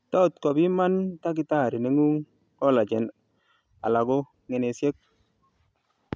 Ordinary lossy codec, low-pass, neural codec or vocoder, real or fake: none; none; none; real